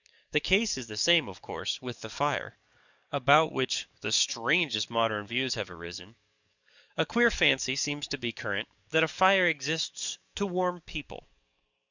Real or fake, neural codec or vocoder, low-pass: fake; codec, 44.1 kHz, 7.8 kbps, DAC; 7.2 kHz